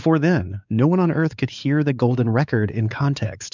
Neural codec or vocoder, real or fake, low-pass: codec, 16 kHz, 4 kbps, X-Codec, WavLM features, trained on Multilingual LibriSpeech; fake; 7.2 kHz